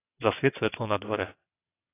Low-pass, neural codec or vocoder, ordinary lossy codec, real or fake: 3.6 kHz; vocoder, 22.05 kHz, 80 mel bands, WaveNeXt; AAC, 16 kbps; fake